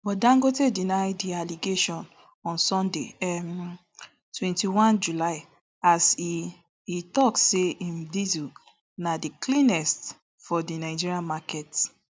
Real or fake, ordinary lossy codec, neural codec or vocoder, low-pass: real; none; none; none